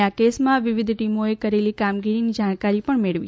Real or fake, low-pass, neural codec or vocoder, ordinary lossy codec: real; none; none; none